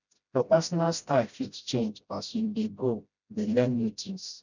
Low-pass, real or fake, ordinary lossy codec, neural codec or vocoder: 7.2 kHz; fake; none; codec, 16 kHz, 0.5 kbps, FreqCodec, smaller model